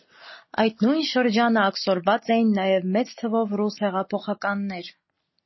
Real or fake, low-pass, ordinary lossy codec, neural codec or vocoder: real; 7.2 kHz; MP3, 24 kbps; none